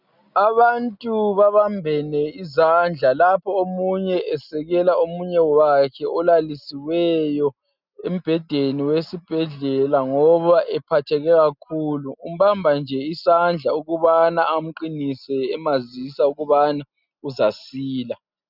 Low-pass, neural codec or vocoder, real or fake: 5.4 kHz; none; real